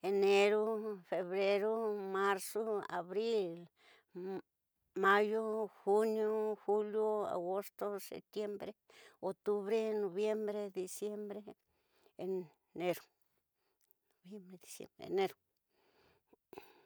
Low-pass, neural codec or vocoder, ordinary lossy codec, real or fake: none; none; none; real